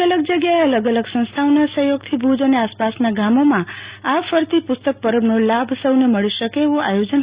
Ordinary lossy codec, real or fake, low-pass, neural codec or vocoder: Opus, 64 kbps; real; 3.6 kHz; none